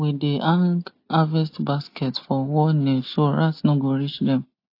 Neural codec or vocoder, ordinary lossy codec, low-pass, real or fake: none; AAC, 32 kbps; 5.4 kHz; real